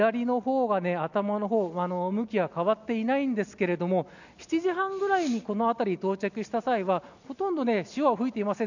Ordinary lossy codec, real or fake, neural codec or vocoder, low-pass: none; real; none; 7.2 kHz